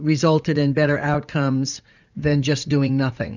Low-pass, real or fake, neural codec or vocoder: 7.2 kHz; fake; vocoder, 44.1 kHz, 80 mel bands, Vocos